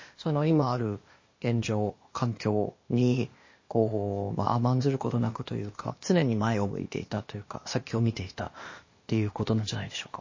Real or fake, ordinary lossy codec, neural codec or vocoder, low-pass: fake; MP3, 32 kbps; codec, 16 kHz, 0.8 kbps, ZipCodec; 7.2 kHz